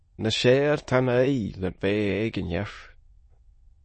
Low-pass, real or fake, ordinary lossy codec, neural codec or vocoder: 9.9 kHz; fake; MP3, 32 kbps; autoencoder, 22.05 kHz, a latent of 192 numbers a frame, VITS, trained on many speakers